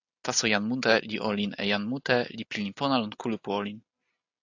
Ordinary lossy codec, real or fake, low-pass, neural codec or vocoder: AAC, 48 kbps; real; 7.2 kHz; none